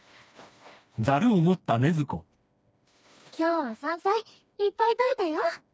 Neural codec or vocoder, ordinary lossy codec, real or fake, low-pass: codec, 16 kHz, 2 kbps, FreqCodec, smaller model; none; fake; none